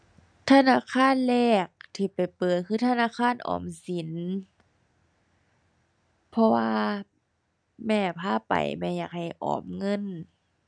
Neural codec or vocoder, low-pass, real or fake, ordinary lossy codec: none; 9.9 kHz; real; none